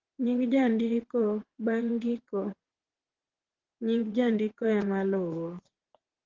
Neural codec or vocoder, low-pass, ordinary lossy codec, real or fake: vocoder, 22.05 kHz, 80 mel bands, WaveNeXt; 7.2 kHz; Opus, 16 kbps; fake